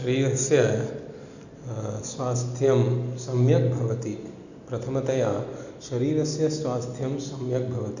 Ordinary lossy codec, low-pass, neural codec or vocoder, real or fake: none; 7.2 kHz; none; real